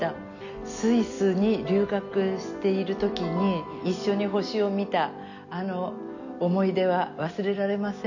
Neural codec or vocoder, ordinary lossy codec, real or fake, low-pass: none; none; real; 7.2 kHz